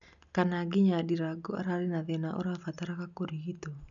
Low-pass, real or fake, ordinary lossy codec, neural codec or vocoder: 7.2 kHz; real; none; none